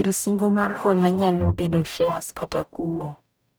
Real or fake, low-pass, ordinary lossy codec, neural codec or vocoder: fake; none; none; codec, 44.1 kHz, 0.9 kbps, DAC